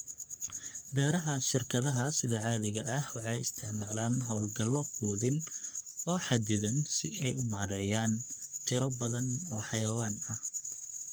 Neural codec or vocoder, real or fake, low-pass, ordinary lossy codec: codec, 44.1 kHz, 3.4 kbps, Pupu-Codec; fake; none; none